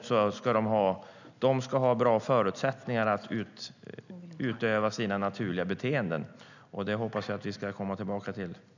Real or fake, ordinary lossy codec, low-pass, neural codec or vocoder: real; none; 7.2 kHz; none